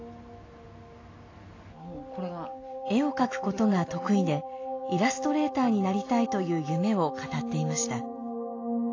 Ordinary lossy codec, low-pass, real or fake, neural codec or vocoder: AAC, 32 kbps; 7.2 kHz; real; none